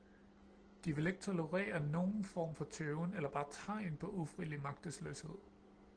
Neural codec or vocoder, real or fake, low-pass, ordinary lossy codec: none; real; 9.9 kHz; Opus, 16 kbps